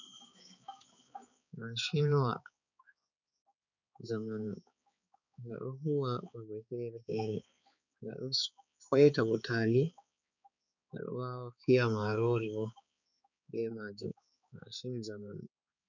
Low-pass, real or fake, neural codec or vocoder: 7.2 kHz; fake; codec, 16 kHz, 4 kbps, X-Codec, HuBERT features, trained on balanced general audio